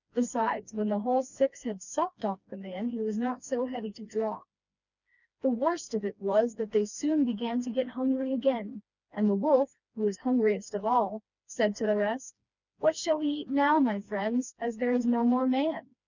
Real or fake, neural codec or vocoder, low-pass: fake; codec, 16 kHz, 2 kbps, FreqCodec, smaller model; 7.2 kHz